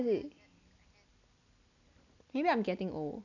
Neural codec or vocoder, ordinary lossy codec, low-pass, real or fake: none; none; 7.2 kHz; real